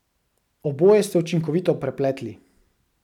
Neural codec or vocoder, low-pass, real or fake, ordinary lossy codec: none; 19.8 kHz; real; none